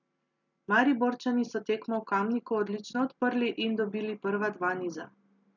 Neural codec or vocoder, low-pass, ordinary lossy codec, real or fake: none; 7.2 kHz; none; real